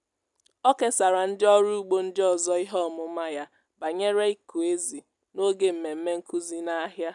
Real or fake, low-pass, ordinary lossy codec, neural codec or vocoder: real; 10.8 kHz; none; none